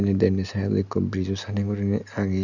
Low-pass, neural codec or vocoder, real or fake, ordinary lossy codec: 7.2 kHz; none; real; none